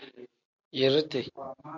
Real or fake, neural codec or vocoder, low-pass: real; none; 7.2 kHz